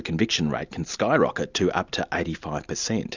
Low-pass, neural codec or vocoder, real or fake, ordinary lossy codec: 7.2 kHz; none; real; Opus, 64 kbps